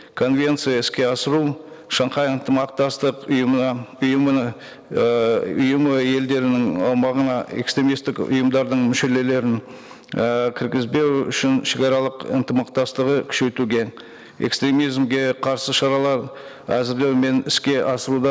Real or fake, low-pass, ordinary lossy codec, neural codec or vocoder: real; none; none; none